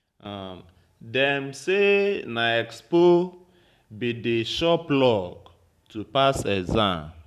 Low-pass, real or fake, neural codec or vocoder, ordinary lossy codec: 14.4 kHz; fake; vocoder, 44.1 kHz, 128 mel bands every 512 samples, BigVGAN v2; none